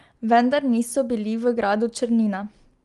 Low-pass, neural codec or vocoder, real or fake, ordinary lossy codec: 10.8 kHz; none; real; Opus, 24 kbps